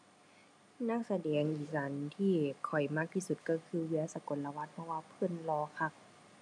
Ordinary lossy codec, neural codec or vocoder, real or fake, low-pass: none; none; real; none